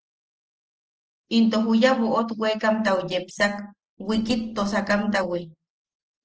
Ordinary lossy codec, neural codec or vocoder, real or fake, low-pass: Opus, 16 kbps; none; real; 7.2 kHz